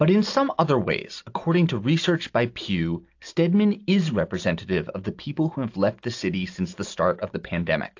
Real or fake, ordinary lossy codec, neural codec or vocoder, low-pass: real; AAC, 48 kbps; none; 7.2 kHz